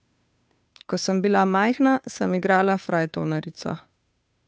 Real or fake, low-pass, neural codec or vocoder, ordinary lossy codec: fake; none; codec, 16 kHz, 2 kbps, FunCodec, trained on Chinese and English, 25 frames a second; none